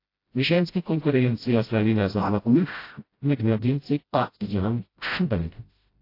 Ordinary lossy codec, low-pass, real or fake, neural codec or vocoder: AAC, 32 kbps; 5.4 kHz; fake; codec, 16 kHz, 0.5 kbps, FreqCodec, smaller model